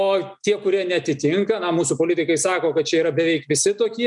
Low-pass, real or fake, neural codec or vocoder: 10.8 kHz; real; none